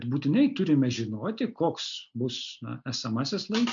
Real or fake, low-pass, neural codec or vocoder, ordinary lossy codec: real; 7.2 kHz; none; MP3, 64 kbps